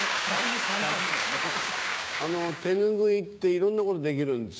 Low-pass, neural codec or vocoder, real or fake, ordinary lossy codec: none; codec, 16 kHz, 6 kbps, DAC; fake; none